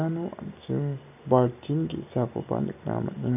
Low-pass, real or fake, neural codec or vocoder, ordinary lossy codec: 3.6 kHz; real; none; none